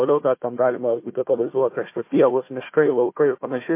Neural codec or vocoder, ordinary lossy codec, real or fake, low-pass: codec, 16 kHz, 1 kbps, FunCodec, trained on Chinese and English, 50 frames a second; MP3, 24 kbps; fake; 3.6 kHz